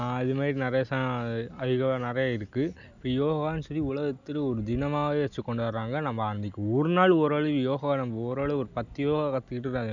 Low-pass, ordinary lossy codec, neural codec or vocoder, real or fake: 7.2 kHz; none; none; real